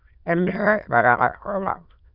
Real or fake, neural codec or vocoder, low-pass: fake; autoencoder, 22.05 kHz, a latent of 192 numbers a frame, VITS, trained on many speakers; 5.4 kHz